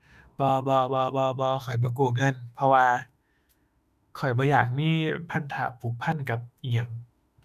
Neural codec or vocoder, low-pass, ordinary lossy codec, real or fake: autoencoder, 48 kHz, 32 numbers a frame, DAC-VAE, trained on Japanese speech; 14.4 kHz; none; fake